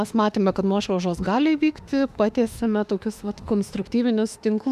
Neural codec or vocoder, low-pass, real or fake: autoencoder, 48 kHz, 32 numbers a frame, DAC-VAE, trained on Japanese speech; 14.4 kHz; fake